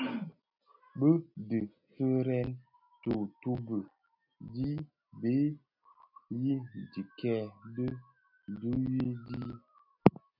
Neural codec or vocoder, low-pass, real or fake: none; 5.4 kHz; real